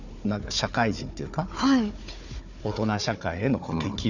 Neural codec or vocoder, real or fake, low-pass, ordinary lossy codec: codec, 16 kHz, 4 kbps, FunCodec, trained on Chinese and English, 50 frames a second; fake; 7.2 kHz; none